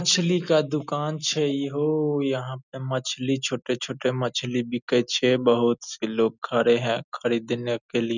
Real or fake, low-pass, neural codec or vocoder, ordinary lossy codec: real; 7.2 kHz; none; none